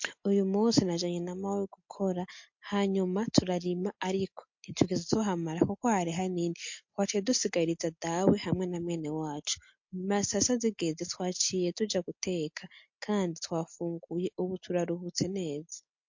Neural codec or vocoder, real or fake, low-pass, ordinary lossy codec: none; real; 7.2 kHz; MP3, 48 kbps